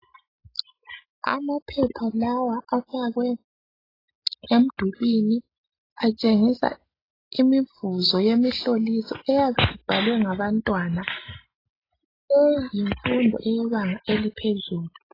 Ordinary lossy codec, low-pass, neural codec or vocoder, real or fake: AAC, 24 kbps; 5.4 kHz; none; real